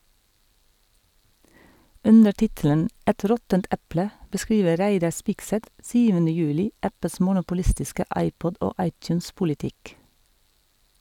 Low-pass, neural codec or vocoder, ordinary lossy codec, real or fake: 19.8 kHz; none; none; real